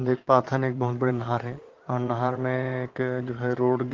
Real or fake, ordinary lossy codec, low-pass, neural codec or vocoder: fake; Opus, 16 kbps; 7.2 kHz; vocoder, 22.05 kHz, 80 mel bands, Vocos